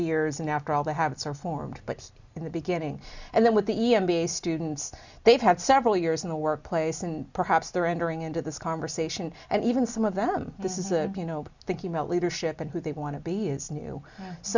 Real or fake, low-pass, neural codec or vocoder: real; 7.2 kHz; none